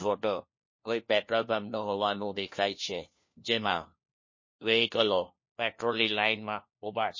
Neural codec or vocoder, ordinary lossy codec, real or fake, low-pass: codec, 16 kHz, 1 kbps, FunCodec, trained on LibriTTS, 50 frames a second; MP3, 32 kbps; fake; 7.2 kHz